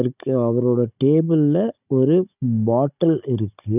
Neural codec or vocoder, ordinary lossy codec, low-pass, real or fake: codec, 16 kHz, 16 kbps, FunCodec, trained on Chinese and English, 50 frames a second; none; 3.6 kHz; fake